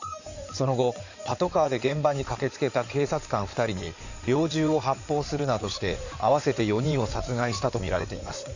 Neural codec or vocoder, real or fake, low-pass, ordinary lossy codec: codec, 16 kHz in and 24 kHz out, 2.2 kbps, FireRedTTS-2 codec; fake; 7.2 kHz; none